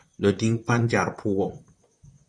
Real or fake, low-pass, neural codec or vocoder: fake; 9.9 kHz; vocoder, 44.1 kHz, 128 mel bands, Pupu-Vocoder